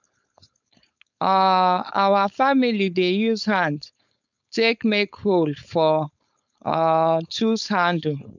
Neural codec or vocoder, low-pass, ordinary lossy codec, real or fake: codec, 16 kHz, 4.8 kbps, FACodec; 7.2 kHz; none; fake